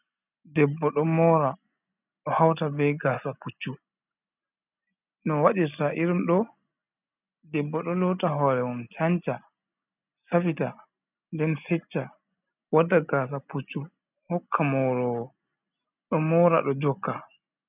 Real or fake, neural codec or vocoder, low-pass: real; none; 3.6 kHz